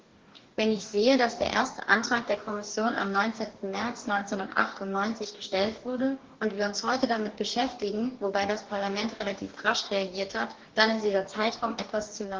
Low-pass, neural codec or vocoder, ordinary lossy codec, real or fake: 7.2 kHz; codec, 44.1 kHz, 2.6 kbps, DAC; Opus, 16 kbps; fake